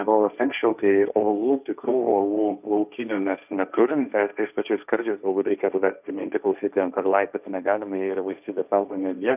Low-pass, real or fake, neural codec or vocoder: 3.6 kHz; fake; codec, 16 kHz, 1.1 kbps, Voila-Tokenizer